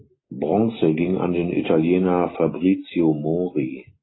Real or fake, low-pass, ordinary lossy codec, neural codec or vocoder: real; 7.2 kHz; AAC, 16 kbps; none